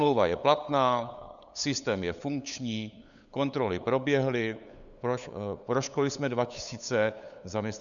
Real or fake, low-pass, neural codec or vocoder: fake; 7.2 kHz; codec, 16 kHz, 8 kbps, FunCodec, trained on LibriTTS, 25 frames a second